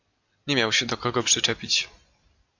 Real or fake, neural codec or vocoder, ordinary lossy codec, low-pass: real; none; AAC, 48 kbps; 7.2 kHz